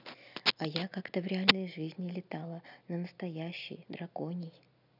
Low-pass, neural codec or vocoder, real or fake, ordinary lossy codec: 5.4 kHz; none; real; none